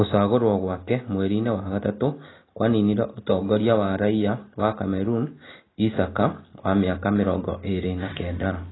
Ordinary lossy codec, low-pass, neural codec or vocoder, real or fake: AAC, 16 kbps; 7.2 kHz; none; real